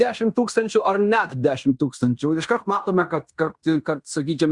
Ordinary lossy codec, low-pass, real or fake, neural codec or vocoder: Opus, 64 kbps; 10.8 kHz; fake; codec, 16 kHz in and 24 kHz out, 0.9 kbps, LongCat-Audio-Codec, fine tuned four codebook decoder